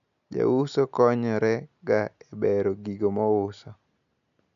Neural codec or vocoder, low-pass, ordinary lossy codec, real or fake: none; 7.2 kHz; none; real